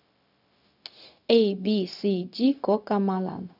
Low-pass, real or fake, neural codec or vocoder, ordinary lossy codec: 5.4 kHz; fake; codec, 16 kHz, 0.4 kbps, LongCat-Audio-Codec; none